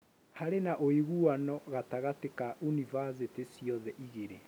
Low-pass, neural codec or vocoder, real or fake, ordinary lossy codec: none; none; real; none